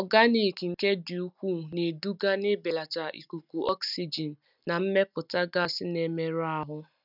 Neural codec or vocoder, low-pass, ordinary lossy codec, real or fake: none; 5.4 kHz; none; real